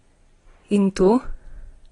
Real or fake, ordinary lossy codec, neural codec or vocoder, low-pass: real; AAC, 32 kbps; none; 10.8 kHz